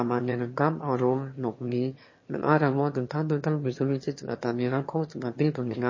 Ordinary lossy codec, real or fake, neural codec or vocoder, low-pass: MP3, 32 kbps; fake; autoencoder, 22.05 kHz, a latent of 192 numbers a frame, VITS, trained on one speaker; 7.2 kHz